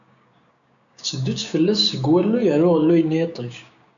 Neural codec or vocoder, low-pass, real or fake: codec, 16 kHz, 6 kbps, DAC; 7.2 kHz; fake